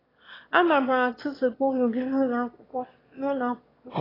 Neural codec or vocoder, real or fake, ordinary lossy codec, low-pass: autoencoder, 22.05 kHz, a latent of 192 numbers a frame, VITS, trained on one speaker; fake; AAC, 24 kbps; 5.4 kHz